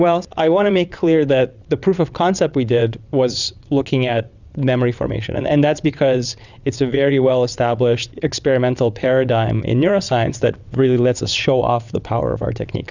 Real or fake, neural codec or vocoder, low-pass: fake; vocoder, 22.05 kHz, 80 mel bands, WaveNeXt; 7.2 kHz